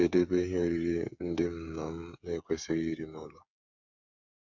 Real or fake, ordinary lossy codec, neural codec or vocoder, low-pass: fake; none; codec, 16 kHz, 8 kbps, FreqCodec, smaller model; 7.2 kHz